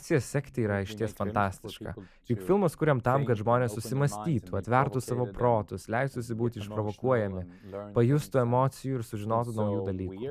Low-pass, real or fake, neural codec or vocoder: 14.4 kHz; real; none